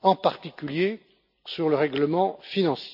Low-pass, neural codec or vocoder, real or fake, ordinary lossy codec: 5.4 kHz; none; real; none